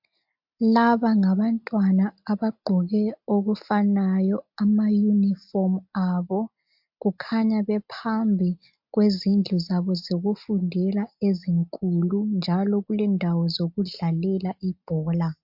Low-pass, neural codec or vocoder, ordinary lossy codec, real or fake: 5.4 kHz; none; MP3, 48 kbps; real